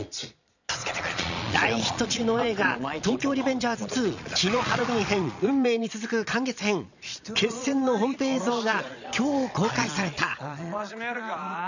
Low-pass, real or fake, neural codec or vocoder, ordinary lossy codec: 7.2 kHz; fake; vocoder, 22.05 kHz, 80 mel bands, Vocos; none